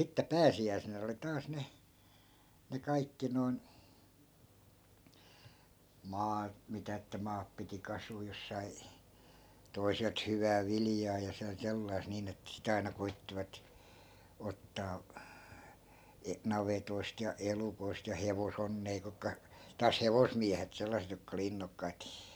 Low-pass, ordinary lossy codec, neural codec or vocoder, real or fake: none; none; none; real